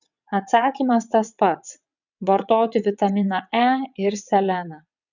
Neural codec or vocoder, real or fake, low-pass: vocoder, 22.05 kHz, 80 mel bands, WaveNeXt; fake; 7.2 kHz